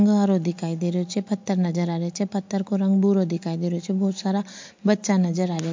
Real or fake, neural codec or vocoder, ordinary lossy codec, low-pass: real; none; MP3, 48 kbps; 7.2 kHz